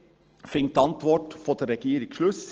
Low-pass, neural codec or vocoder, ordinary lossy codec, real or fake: 7.2 kHz; none; Opus, 16 kbps; real